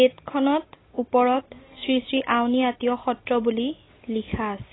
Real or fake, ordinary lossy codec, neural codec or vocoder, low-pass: real; AAC, 16 kbps; none; 7.2 kHz